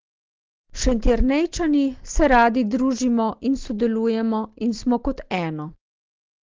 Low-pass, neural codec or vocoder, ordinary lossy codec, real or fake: 7.2 kHz; none; Opus, 16 kbps; real